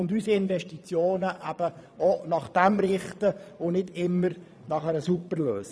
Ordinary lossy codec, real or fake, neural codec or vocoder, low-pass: none; fake; vocoder, 22.05 kHz, 80 mel bands, Vocos; none